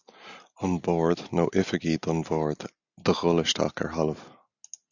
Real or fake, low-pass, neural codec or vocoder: real; 7.2 kHz; none